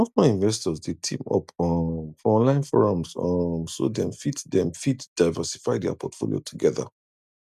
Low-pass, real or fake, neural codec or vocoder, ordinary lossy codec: 14.4 kHz; real; none; none